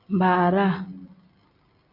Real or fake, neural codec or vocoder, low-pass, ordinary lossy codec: real; none; 5.4 kHz; AAC, 32 kbps